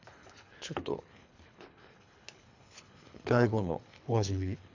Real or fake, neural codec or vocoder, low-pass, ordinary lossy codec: fake; codec, 24 kHz, 3 kbps, HILCodec; 7.2 kHz; AAC, 48 kbps